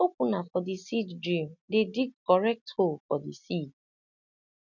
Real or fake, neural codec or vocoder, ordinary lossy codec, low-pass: real; none; none; 7.2 kHz